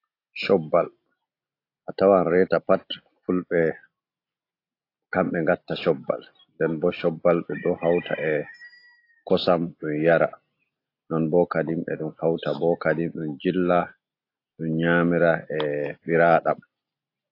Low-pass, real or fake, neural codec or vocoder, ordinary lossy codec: 5.4 kHz; real; none; AAC, 32 kbps